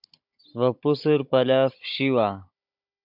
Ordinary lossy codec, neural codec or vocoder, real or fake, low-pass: MP3, 48 kbps; codec, 16 kHz, 16 kbps, FunCodec, trained on Chinese and English, 50 frames a second; fake; 5.4 kHz